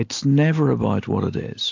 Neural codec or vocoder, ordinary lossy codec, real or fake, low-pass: none; AAC, 48 kbps; real; 7.2 kHz